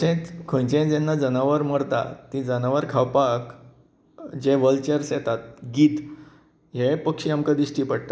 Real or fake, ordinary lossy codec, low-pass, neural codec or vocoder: real; none; none; none